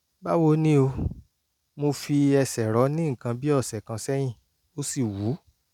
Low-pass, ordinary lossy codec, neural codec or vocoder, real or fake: 19.8 kHz; none; none; real